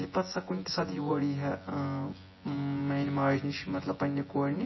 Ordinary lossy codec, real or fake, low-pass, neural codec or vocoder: MP3, 24 kbps; fake; 7.2 kHz; vocoder, 24 kHz, 100 mel bands, Vocos